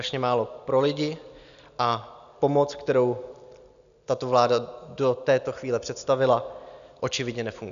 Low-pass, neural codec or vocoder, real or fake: 7.2 kHz; none; real